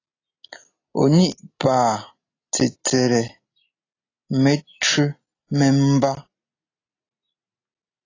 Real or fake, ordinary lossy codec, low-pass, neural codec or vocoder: real; AAC, 32 kbps; 7.2 kHz; none